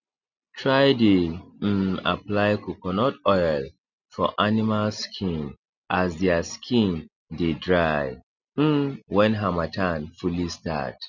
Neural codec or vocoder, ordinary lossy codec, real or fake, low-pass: none; none; real; 7.2 kHz